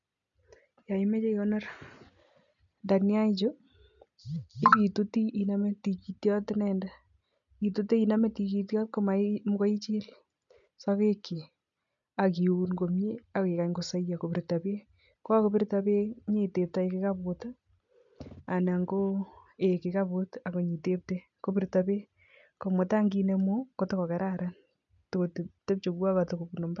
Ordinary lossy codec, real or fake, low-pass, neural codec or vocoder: none; real; 7.2 kHz; none